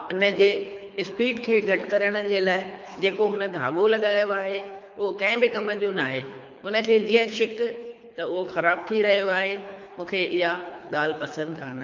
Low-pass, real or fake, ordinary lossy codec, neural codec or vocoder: 7.2 kHz; fake; MP3, 48 kbps; codec, 24 kHz, 3 kbps, HILCodec